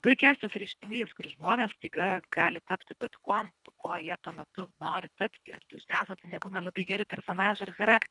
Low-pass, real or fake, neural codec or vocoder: 10.8 kHz; fake; codec, 24 kHz, 1.5 kbps, HILCodec